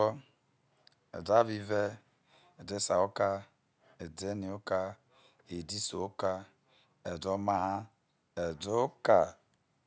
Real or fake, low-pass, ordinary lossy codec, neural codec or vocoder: real; none; none; none